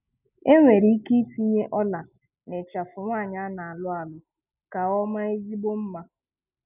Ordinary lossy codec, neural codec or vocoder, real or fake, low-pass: none; none; real; 3.6 kHz